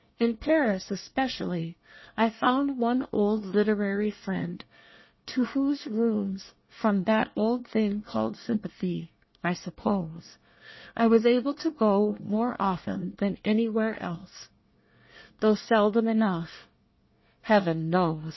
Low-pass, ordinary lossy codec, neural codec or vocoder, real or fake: 7.2 kHz; MP3, 24 kbps; codec, 24 kHz, 1 kbps, SNAC; fake